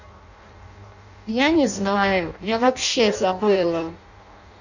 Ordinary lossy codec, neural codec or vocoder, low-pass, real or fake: none; codec, 16 kHz in and 24 kHz out, 0.6 kbps, FireRedTTS-2 codec; 7.2 kHz; fake